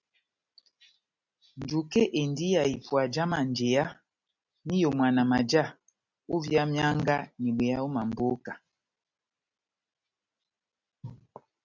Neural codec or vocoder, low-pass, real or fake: none; 7.2 kHz; real